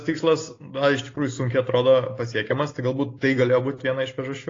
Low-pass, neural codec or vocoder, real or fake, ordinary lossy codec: 7.2 kHz; none; real; AAC, 32 kbps